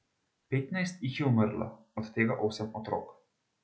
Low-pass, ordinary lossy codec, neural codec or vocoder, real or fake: none; none; none; real